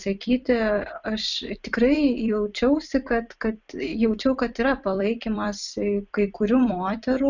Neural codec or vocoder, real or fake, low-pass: vocoder, 44.1 kHz, 128 mel bands every 256 samples, BigVGAN v2; fake; 7.2 kHz